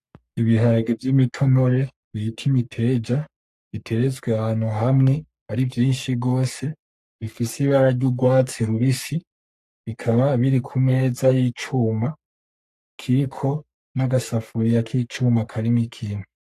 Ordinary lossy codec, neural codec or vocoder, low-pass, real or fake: AAC, 64 kbps; codec, 44.1 kHz, 3.4 kbps, Pupu-Codec; 14.4 kHz; fake